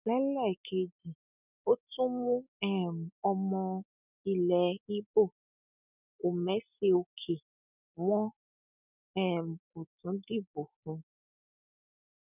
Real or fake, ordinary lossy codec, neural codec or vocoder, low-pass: real; none; none; 3.6 kHz